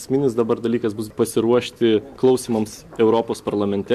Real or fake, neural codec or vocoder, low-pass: real; none; 14.4 kHz